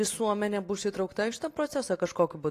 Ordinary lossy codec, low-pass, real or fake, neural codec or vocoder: AAC, 48 kbps; 14.4 kHz; real; none